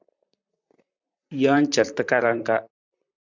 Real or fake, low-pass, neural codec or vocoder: fake; 7.2 kHz; vocoder, 44.1 kHz, 128 mel bands, Pupu-Vocoder